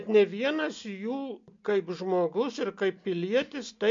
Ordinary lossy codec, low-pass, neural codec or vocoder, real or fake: MP3, 48 kbps; 7.2 kHz; none; real